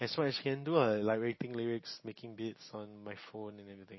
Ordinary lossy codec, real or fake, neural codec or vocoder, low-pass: MP3, 24 kbps; real; none; 7.2 kHz